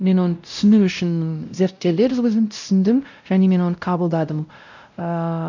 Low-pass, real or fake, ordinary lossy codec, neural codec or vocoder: 7.2 kHz; fake; none; codec, 16 kHz, 0.5 kbps, X-Codec, WavLM features, trained on Multilingual LibriSpeech